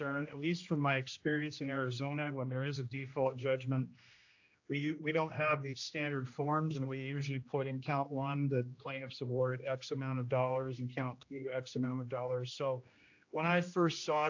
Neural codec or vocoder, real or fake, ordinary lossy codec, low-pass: codec, 16 kHz, 1 kbps, X-Codec, HuBERT features, trained on general audio; fake; AAC, 48 kbps; 7.2 kHz